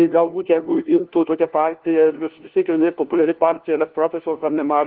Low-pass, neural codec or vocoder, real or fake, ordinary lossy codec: 5.4 kHz; codec, 16 kHz, 1 kbps, FunCodec, trained on LibriTTS, 50 frames a second; fake; Opus, 16 kbps